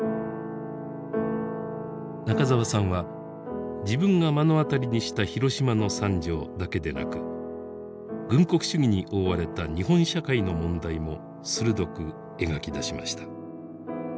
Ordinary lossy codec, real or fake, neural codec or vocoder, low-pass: none; real; none; none